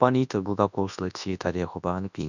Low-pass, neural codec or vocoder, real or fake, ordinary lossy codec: 7.2 kHz; codec, 24 kHz, 0.9 kbps, WavTokenizer, large speech release; fake; none